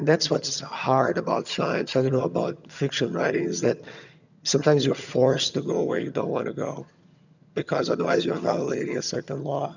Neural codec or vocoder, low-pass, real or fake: vocoder, 22.05 kHz, 80 mel bands, HiFi-GAN; 7.2 kHz; fake